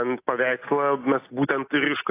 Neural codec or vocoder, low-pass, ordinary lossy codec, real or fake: none; 3.6 kHz; AAC, 24 kbps; real